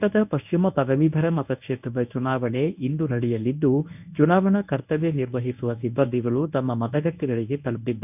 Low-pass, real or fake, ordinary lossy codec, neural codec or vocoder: 3.6 kHz; fake; none; codec, 24 kHz, 0.9 kbps, WavTokenizer, medium speech release version 1